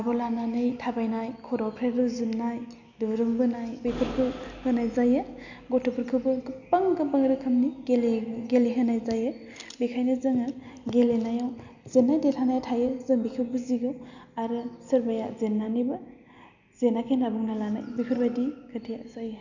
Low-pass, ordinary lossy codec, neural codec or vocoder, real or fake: 7.2 kHz; none; none; real